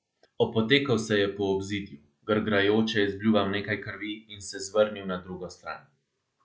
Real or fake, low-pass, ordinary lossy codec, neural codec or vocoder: real; none; none; none